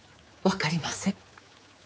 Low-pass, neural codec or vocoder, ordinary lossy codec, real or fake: none; codec, 16 kHz, 4 kbps, X-Codec, HuBERT features, trained on general audio; none; fake